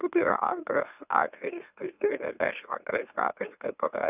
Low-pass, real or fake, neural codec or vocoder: 3.6 kHz; fake; autoencoder, 44.1 kHz, a latent of 192 numbers a frame, MeloTTS